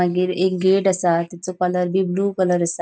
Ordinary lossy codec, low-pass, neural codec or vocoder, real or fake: none; none; none; real